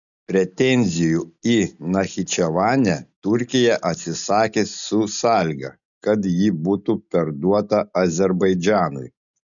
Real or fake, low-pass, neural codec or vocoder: real; 7.2 kHz; none